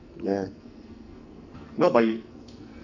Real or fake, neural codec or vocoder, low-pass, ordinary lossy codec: fake; codec, 44.1 kHz, 2.6 kbps, SNAC; 7.2 kHz; none